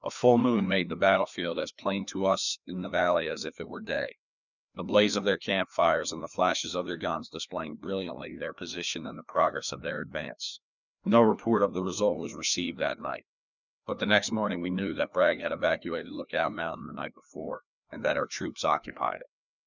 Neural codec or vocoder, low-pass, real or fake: codec, 16 kHz, 2 kbps, FreqCodec, larger model; 7.2 kHz; fake